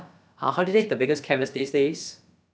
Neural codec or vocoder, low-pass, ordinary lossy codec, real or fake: codec, 16 kHz, about 1 kbps, DyCAST, with the encoder's durations; none; none; fake